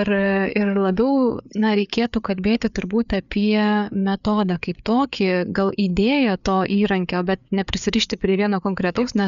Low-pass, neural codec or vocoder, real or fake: 7.2 kHz; codec, 16 kHz, 4 kbps, FreqCodec, larger model; fake